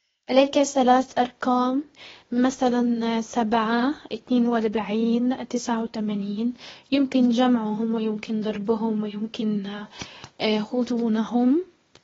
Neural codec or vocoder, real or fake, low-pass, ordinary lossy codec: codec, 16 kHz, 0.8 kbps, ZipCodec; fake; 7.2 kHz; AAC, 24 kbps